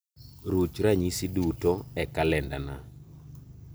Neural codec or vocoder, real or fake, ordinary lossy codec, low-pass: none; real; none; none